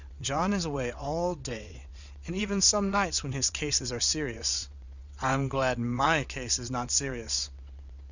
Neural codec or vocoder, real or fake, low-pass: vocoder, 22.05 kHz, 80 mel bands, WaveNeXt; fake; 7.2 kHz